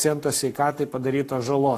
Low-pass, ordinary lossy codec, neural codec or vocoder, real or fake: 14.4 kHz; AAC, 48 kbps; codec, 44.1 kHz, 7.8 kbps, Pupu-Codec; fake